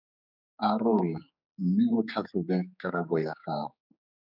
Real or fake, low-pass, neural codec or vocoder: fake; 5.4 kHz; codec, 16 kHz, 4 kbps, X-Codec, HuBERT features, trained on general audio